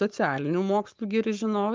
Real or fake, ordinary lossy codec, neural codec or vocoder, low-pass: fake; Opus, 24 kbps; codec, 44.1 kHz, 7.8 kbps, Pupu-Codec; 7.2 kHz